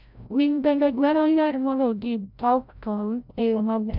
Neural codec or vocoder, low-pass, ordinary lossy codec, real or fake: codec, 16 kHz, 0.5 kbps, FreqCodec, larger model; 5.4 kHz; none; fake